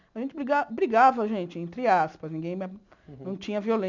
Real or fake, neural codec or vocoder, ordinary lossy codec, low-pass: real; none; none; 7.2 kHz